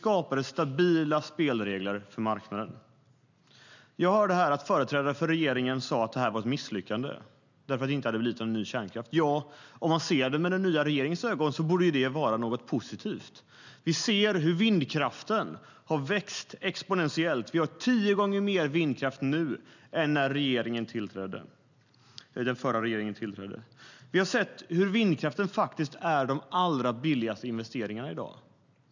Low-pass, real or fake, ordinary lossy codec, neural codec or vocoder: 7.2 kHz; real; none; none